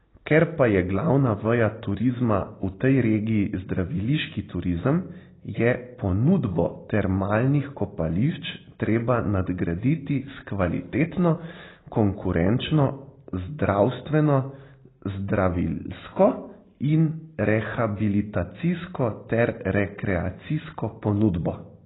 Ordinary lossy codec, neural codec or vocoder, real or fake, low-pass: AAC, 16 kbps; none; real; 7.2 kHz